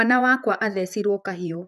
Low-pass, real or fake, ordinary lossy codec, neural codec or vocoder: 14.4 kHz; fake; none; vocoder, 44.1 kHz, 128 mel bands, Pupu-Vocoder